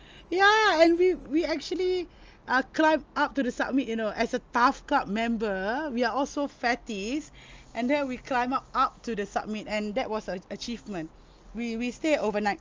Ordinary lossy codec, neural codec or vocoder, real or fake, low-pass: Opus, 24 kbps; none; real; 7.2 kHz